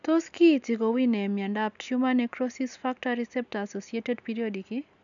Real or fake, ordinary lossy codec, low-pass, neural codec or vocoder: real; none; 7.2 kHz; none